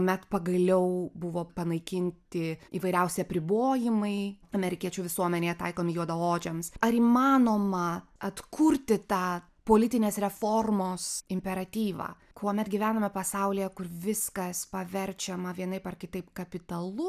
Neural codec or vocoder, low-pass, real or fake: none; 14.4 kHz; real